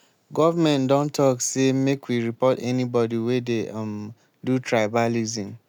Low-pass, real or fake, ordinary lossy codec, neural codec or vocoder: 19.8 kHz; real; none; none